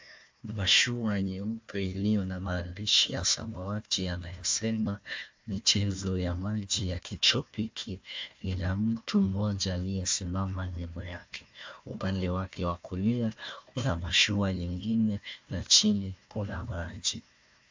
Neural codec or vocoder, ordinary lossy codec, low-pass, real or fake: codec, 16 kHz, 1 kbps, FunCodec, trained on Chinese and English, 50 frames a second; MP3, 64 kbps; 7.2 kHz; fake